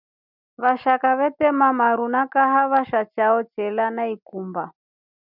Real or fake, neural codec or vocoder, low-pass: real; none; 5.4 kHz